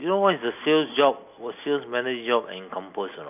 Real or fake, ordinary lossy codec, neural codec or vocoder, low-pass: real; none; none; 3.6 kHz